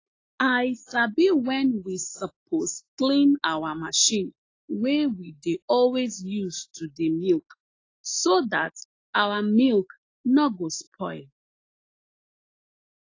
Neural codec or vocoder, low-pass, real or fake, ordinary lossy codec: none; 7.2 kHz; real; AAC, 32 kbps